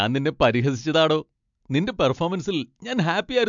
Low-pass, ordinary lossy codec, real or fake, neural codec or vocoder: 7.2 kHz; MP3, 64 kbps; real; none